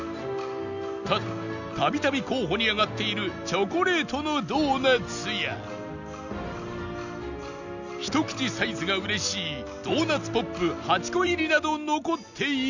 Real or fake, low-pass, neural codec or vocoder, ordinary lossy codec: real; 7.2 kHz; none; none